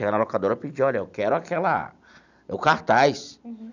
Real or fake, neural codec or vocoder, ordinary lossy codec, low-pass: real; none; none; 7.2 kHz